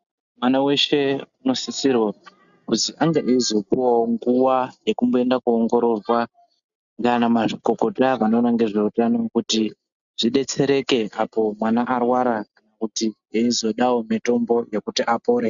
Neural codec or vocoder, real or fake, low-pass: none; real; 7.2 kHz